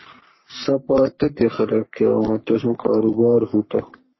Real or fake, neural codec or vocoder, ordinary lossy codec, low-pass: fake; codec, 44.1 kHz, 3.4 kbps, Pupu-Codec; MP3, 24 kbps; 7.2 kHz